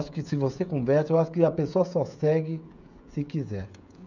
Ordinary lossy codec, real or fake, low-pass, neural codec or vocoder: none; fake; 7.2 kHz; codec, 16 kHz, 16 kbps, FreqCodec, smaller model